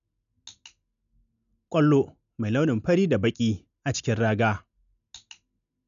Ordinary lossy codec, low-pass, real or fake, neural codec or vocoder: none; 7.2 kHz; real; none